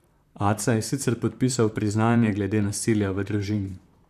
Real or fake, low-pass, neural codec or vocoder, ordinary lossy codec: fake; 14.4 kHz; vocoder, 44.1 kHz, 128 mel bands, Pupu-Vocoder; none